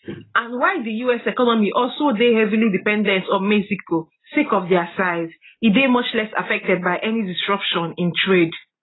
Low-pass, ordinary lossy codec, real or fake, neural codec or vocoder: 7.2 kHz; AAC, 16 kbps; real; none